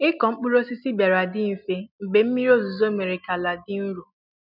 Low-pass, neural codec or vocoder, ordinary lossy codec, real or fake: 5.4 kHz; none; none; real